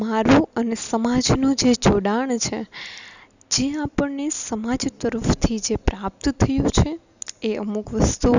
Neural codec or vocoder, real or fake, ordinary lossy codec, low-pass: none; real; none; 7.2 kHz